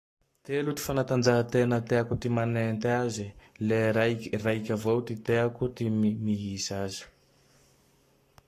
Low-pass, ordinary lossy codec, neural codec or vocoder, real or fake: 14.4 kHz; AAC, 48 kbps; codec, 44.1 kHz, 7.8 kbps, Pupu-Codec; fake